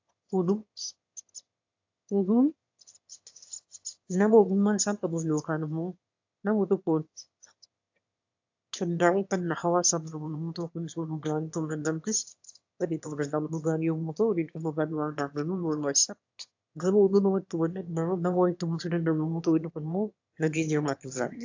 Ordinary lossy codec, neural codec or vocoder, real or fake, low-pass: AAC, 48 kbps; autoencoder, 22.05 kHz, a latent of 192 numbers a frame, VITS, trained on one speaker; fake; 7.2 kHz